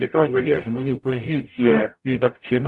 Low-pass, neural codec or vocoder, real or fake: 10.8 kHz; codec, 44.1 kHz, 0.9 kbps, DAC; fake